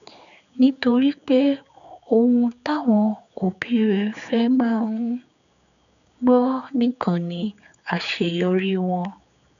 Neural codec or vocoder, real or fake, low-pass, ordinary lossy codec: codec, 16 kHz, 4 kbps, X-Codec, HuBERT features, trained on general audio; fake; 7.2 kHz; none